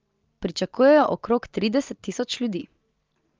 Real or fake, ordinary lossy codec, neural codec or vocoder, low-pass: real; Opus, 24 kbps; none; 7.2 kHz